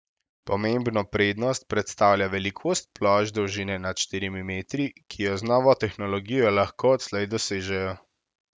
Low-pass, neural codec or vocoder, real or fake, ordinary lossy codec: none; none; real; none